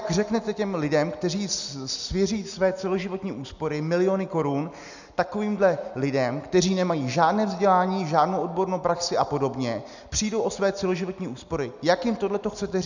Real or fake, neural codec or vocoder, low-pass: real; none; 7.2 kHz